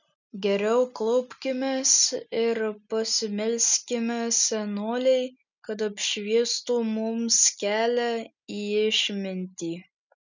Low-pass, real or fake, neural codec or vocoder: 7.2 kHz; real; none